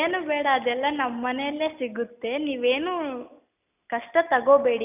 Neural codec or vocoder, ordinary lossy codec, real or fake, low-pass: none; none; real; 3.6 kHz